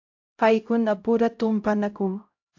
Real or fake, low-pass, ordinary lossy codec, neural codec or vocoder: fake; 7.2 kHz; AAC, 48 kbps; codec, 16 kHz, 0.5 kbps, X-Codec, HuBERT features, trained on LibriSpeech